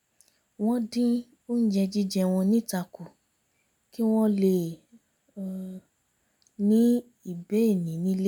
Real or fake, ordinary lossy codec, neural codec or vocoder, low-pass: real; none; none; 19.8 kHz